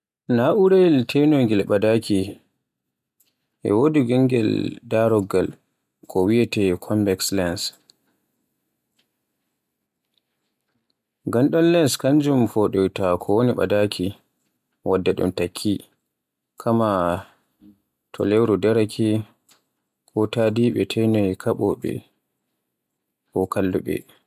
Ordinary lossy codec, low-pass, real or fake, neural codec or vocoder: MP3, 96 kbps; 14.4 kHz; real; none